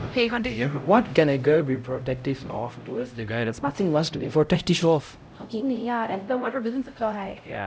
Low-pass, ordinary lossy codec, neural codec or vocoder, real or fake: none; none; codec, 16 kHz, 0.5 kbps, X-Codec, HuBERT features, trained on LibriSpeech; fake